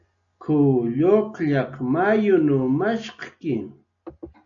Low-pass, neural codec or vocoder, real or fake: 7.2 kHz; none; real